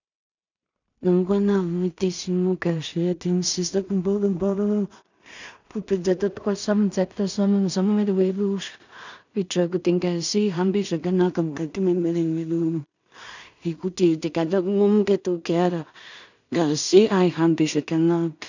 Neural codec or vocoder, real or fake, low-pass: codec, 16 kHz in and 24 kHz out, 0.4 kbps, LongCat-Audio-Codec, two codebook decoder; fake; 7.2 kHz